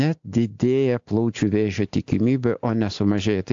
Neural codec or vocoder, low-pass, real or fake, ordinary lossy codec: none; 7.2 kHz; real; AAC, 64 kbps